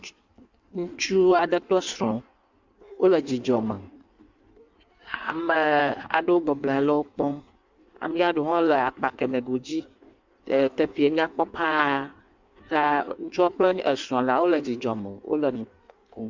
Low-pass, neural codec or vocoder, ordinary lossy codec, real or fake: 7.2 kHz; codec, 16 kHz in and 24 kHz out, 1.1 kbps, FireRedTTS-2 codec; MP3, 64 kbps; fake